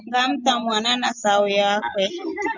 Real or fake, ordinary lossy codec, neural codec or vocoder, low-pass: real; Opus, 64 kbps; none; 7.2 kHz